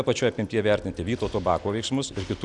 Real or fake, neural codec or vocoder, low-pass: real; none; 10.8 kHz